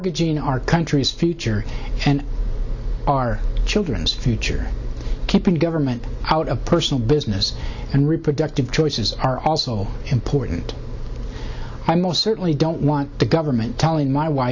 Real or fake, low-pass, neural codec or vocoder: real; 7.2 kHz; none